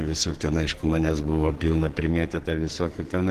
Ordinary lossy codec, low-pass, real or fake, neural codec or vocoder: Opus, 16 kbps; 14.4 kHz; fake; codec, 44.1 kHz, 2.6 kbps, SNAC